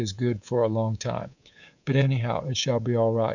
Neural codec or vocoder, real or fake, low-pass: codec, 24 kHz, 3.1 kbps, DualCodec; fake; 7.2 kHz